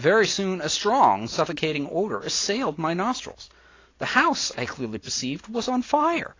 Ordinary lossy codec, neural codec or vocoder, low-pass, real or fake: AAC, 32 kbps; none; 7.2 kHz; real